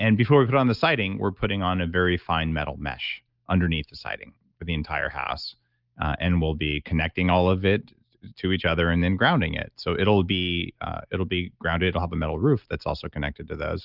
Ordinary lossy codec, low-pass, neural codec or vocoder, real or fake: Opus, 24 kbps; 5.4 kHz; none; real